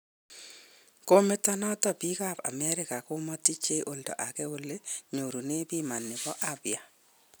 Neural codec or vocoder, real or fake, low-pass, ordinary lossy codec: none; real; none; none